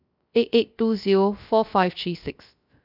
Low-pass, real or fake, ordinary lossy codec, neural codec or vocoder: 5.4 kHz; fake; none; codec, 16 kHz, 0.3 kbps, FocalCodec